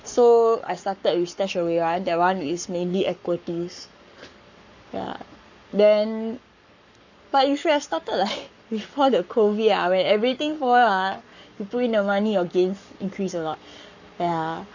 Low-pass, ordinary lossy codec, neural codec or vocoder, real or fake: 7.2 kHz; none; codec, 44.1 kHz, 7.8 kbps, Pupu-Codec; fake